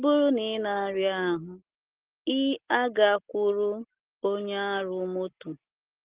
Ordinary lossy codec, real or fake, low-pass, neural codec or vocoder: Opus, 16 kbps; real; 3.6 kHz; none